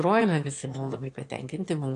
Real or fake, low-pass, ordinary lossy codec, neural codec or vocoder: fake; 9.9 kHz; AAC, 48 kbps; autoencoder, 22.05 kHz, a latent of 192 numbers a frame, VITS, trained on one speaker